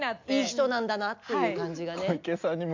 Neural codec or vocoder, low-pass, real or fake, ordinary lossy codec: none; 7.2 kHz; real; MP3, 64 kbps